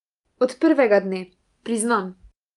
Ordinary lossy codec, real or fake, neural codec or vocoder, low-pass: Opus, 32 kbps; real; none; 10.8 kHz